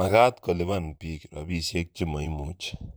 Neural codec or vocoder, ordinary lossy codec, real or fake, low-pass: vocoder, 44.1 kHz, 128 mel bands, Pupu-Vocoder; none; fake; none